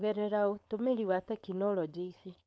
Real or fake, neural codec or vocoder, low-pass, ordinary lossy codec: fake; codec, 16 kHz, 4.8 kbps, FACodec; none; none